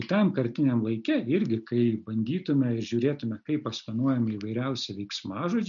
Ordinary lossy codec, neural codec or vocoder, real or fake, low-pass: MP3, 96 kbps; none; real; 7.2 kHz